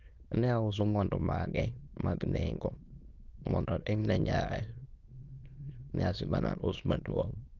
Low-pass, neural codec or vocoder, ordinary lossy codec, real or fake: 7.2 kHz; autoencoder, 22.05 kHz, a latent of 192 numbers a frame, VITS, trained on many speakers; Opus, 32 kbps; fake